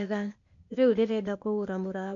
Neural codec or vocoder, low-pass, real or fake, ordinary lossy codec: codec, 16 kHz, 0.8 kbps, ZipCodec; 7.2 kHz; fake; MP3, 48 kbps